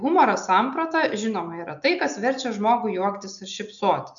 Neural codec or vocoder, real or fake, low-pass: none; real; 7.2 kHz